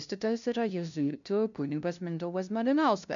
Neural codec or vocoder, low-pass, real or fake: codec, 16 kHz, 0.5 kbps, FunCodec, trained on LibriTTS, 25 frames a second; 7.2 kHz; fake